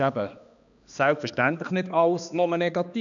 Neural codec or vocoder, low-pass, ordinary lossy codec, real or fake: codec, 16 kHz, 2 kbps, X-Codec, HuBERT features, trained on balanced general audio; 7.2 kHz; none; fake